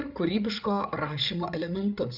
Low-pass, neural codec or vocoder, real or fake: 5.4 kHz; codec, 16 kHz, 8 kbps, FreqCodec, larger model; fake